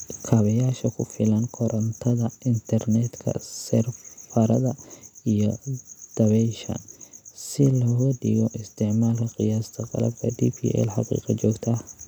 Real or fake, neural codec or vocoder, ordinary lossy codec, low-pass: real; none; none; 19.8 kHz